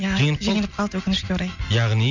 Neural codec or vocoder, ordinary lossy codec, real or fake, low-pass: none; none; real; 7.2 kHz